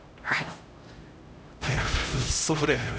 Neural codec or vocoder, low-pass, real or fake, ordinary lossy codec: codec, 16 kHz, 0.5 kbps, X-Codec, HuBERT features, trained on LibriSpeech; none; fake; none